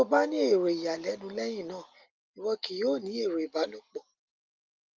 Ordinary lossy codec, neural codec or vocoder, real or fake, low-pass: Opus, 24 kbps; none; real; 7.2 kHz